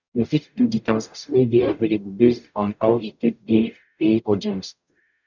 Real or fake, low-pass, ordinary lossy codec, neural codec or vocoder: fake; 7.2 kHz; none; codec, 44.1 kHz, 0.9 kbps, DAC